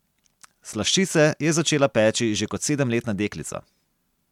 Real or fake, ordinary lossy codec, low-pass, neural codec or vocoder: real; MP3, 96 kbps; 19.8 kHz; none